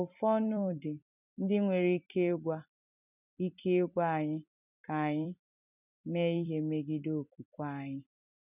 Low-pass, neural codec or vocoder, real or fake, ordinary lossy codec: 3.6 kHz; vocoder, 44.1 kHz, 128 mel bands every 256 samples, BigVGAN v2; fake; none